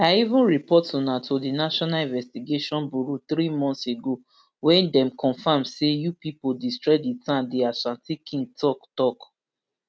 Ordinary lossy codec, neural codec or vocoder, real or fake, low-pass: none; none; real; none